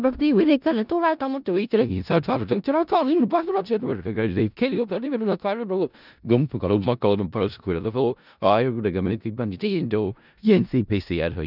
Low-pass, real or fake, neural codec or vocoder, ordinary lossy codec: 5.4 kHz; fake; codec, 16 kHz in and 24 kHz out, 0.4 kbps, LongCat-Audio-Codec, four codebook decoder; none